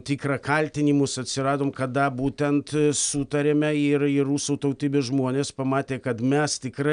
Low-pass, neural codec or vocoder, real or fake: 9.9 kHz; none; real